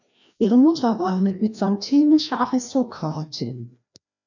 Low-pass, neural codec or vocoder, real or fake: 7.2 kHz; codec, 16 kHz, 1 kbps, FreqCodec, larger model; fake